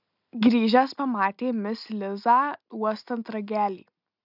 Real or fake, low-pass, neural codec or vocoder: real; 5.4 kHz; none